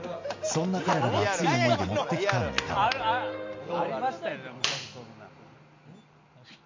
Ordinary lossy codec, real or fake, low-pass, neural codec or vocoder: MP3, 48 kbps; real; 7.2 kHz; none